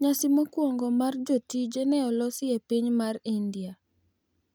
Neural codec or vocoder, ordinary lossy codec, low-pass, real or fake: none; none; none; real